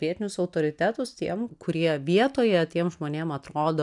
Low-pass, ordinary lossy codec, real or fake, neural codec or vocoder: 10.8 kHz; MP3, 96 kbps; real; none